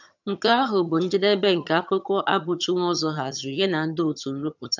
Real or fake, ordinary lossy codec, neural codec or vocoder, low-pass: fake; none; vocoder, 22.05 kHz, 80 mel bands, HiFi-GAN; 7.2 kHz